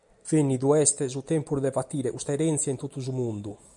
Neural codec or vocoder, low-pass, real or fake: none; 10.8 kHz; real